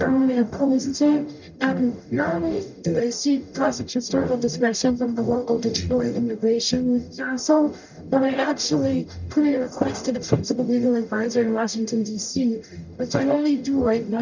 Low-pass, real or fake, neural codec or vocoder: 7.2 kHz; fake; codec, 44.1 kHz, 0.9 kbps, DAC